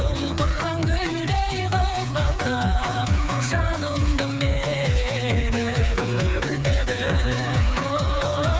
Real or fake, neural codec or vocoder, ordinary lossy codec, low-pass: fake; codec, 16 kHz, 4 kbps, FreqCodec, smaller model; none; none